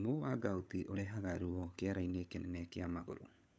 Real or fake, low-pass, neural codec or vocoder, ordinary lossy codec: fake; none; codec, 16 kHz, 16 kbps, FunCodec, trained on LibriTTS, 50 frames a second; none